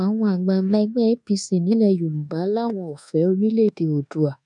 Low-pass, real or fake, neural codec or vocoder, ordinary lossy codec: 10.8 kHz; fake; codec, 24 kHz, 1.2 kbps, DualCodec; none